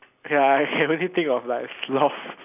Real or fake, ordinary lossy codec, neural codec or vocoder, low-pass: real; none; none; 3.6 kHz